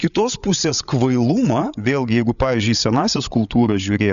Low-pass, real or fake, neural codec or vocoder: 7.2 kHz; fake; codec, 16 kHz, 8 kbps, FreqCodec, larger model